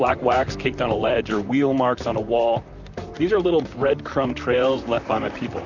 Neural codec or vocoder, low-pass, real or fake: vocoder, 44.1 kHz, 128 mel bands, Pupu-Vocoder; 7.2 kHz; fake